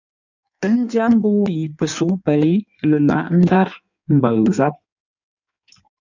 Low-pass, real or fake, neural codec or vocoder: 7.2 kHz; fake; codec, 16 kHz in and 24 kHz out, 1.1 kbps, FireRedTTS-2 codec